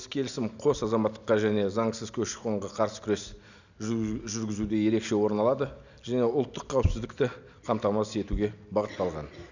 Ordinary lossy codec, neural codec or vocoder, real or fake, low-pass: none; none; real; 7.2 kHz